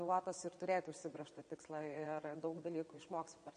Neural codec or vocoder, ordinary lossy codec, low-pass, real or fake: vocoder, 22.05 kHz, 80 mel bands, WaveNeXt; MP3, 48 kbps; 9.9 kHz; fake